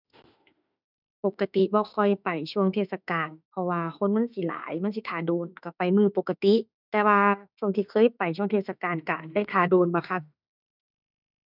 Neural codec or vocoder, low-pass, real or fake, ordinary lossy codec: autoencoder, 48 kHz, 32 numbers a frame, DAC-VAE, trained on Japanese speech; 5.4 kHz; fake; none